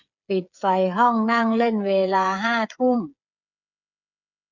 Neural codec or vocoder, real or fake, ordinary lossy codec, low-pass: codec, 16 kHz, 8 kbps, FreqCodec, smaller model; fake; none; 7.2 kHz